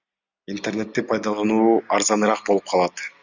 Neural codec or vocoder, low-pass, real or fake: none; 7.2 kHz; real